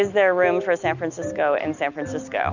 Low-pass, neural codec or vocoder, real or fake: 7.2 kHz; none; real